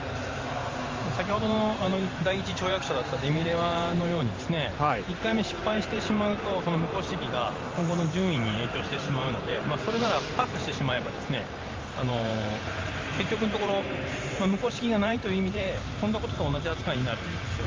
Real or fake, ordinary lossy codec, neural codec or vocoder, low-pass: fake; Opus, 32 kbps; vocoder, 44.1 kHz, 128 mel bands every 512 samples, BigVGAN v2; 7.2 kHz